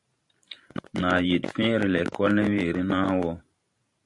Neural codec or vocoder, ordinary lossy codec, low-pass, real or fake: vocoder, 44.1 kHz, 128 mel bands every 512 samples, BigVGAN v2; MP3, 64 kbps; 10.8 kHz; fake